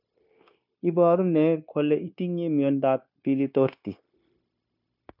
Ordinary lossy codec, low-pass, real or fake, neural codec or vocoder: MP3, 48 kbps; 5.4 kHz; fake; codec, 16 kHz, 0.9 kbps, LongCat-Audio-Codec